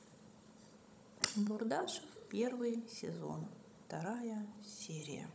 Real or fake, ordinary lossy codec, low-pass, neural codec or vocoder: fake; none; none; codec, 16 kHz, 16 kbps, FunCodec, trained on Chinese and English, 50 frames a second